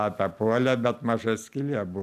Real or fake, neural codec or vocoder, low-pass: real; none; 14.4 kHz